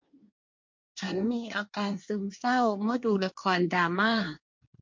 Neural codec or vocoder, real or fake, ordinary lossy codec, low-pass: codec, 24 kHz, 1 kbps, SNAC; fake; MP3, 48 kbps; 7.2 kHz